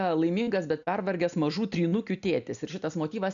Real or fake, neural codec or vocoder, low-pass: real; none; 7.2 kHz